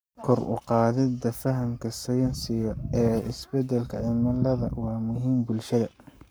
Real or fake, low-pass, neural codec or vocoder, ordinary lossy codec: fake; none; codec, 44.1 kHz, 7.8 kbps, Pupu-Codec; none